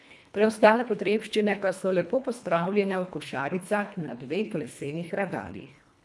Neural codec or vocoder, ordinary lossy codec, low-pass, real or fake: codec, 24 kHz, 1.5 kbps, HILCodec; none; none; fake